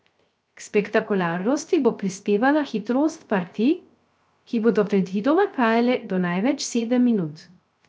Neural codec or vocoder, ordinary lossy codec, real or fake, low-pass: codec, 16 kHz, 0.3 kbps, FocalCodec; none; fake; none